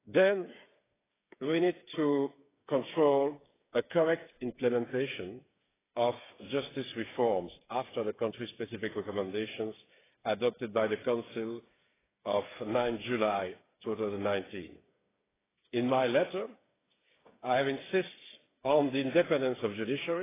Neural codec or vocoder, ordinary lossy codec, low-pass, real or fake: codec, 16 kHz, 8 kbps, FreqCodec, smaller model; AAC, 16 kbps; 3.6 kHz; fake